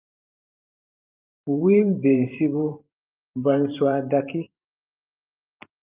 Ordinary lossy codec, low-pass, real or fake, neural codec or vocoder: Opus, 32 kbps; 3.6 kHz; fake; vocoder, 44.1 kHz, 128 mel bands every 512 samples, BigVGAN v2